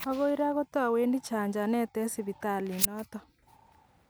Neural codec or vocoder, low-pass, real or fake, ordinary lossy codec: none; none; real; none